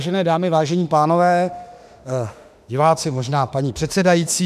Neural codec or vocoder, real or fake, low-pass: autoencoder, 48 kHz, 32 numbers a frame, DAC-VAE, trained on Japanese speech; fake; 14.4 kHz